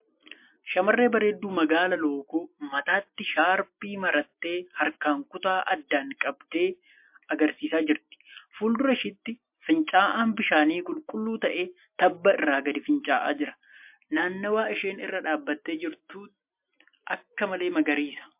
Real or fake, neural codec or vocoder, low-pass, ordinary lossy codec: real; none; 3.6 kHz; MP3, 32 kbps